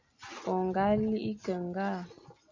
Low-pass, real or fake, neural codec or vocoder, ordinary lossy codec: 7.2 kHz; real; none; MP3, 64 kbps